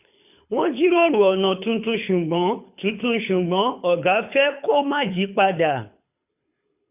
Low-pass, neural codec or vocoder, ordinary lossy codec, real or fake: 3.6 kHz; codec, 24 kHz, 6 kbps, HILCodec; none; fake